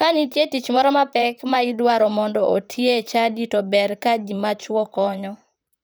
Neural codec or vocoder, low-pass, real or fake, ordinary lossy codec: vocoder, 44.1 kHz, 128 mel bands, Pupu-Vocoder; none; fake; none